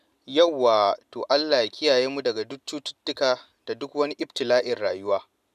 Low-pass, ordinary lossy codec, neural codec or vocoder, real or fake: 14.4 kHz; none; none; real